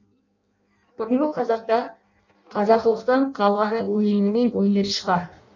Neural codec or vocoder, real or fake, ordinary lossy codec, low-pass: codec, 16 kHz in and 24 kHz out, 0.6 kbps, FireRedTTS-2 codec; fake; none; 7.2 kHz